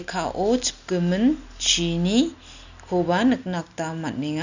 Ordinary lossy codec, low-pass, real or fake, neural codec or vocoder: none; 7.2 kHz; real; none